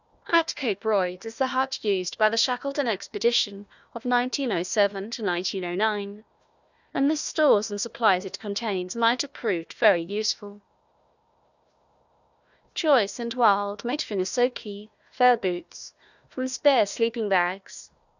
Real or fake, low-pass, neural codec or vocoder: fake; 7.2 kHz; codec, 16 kHz, 1 kbps, FunCodec, trained on Chinese and English, 50 frames a second